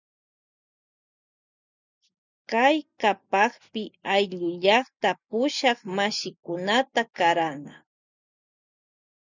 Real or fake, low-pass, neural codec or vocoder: real; 7.2 kHz; none